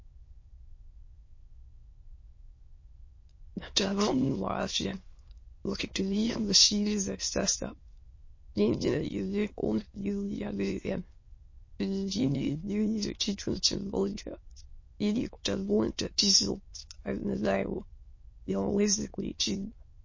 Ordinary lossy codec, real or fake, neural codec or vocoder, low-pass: MP3, 32 kbps; fake; autoencoder, 22.05 kHz, a latent of 192 numbers a frame, VITS, trained on many speakers; 7.2 kHz